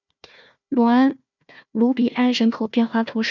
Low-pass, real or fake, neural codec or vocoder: 7.2 kHz; fake; codec, 16 kHz, 1 kbps, FunCodec, trained on Chinese and English, 50 frames a second